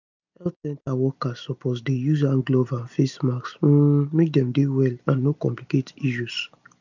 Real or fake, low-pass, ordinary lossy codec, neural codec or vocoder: real; 7.2 kHz; none; none